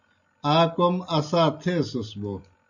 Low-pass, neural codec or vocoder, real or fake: 7.2 kHz; none; real